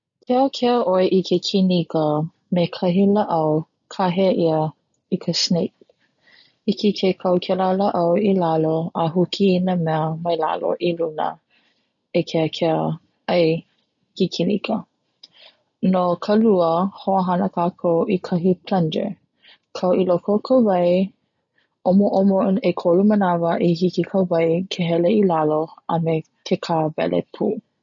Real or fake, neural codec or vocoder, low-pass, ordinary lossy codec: real; none; 7.2 kHz; none